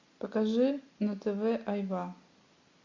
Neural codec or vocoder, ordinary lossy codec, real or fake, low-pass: none; MP3, 48 kbps; real; 7.2 kHz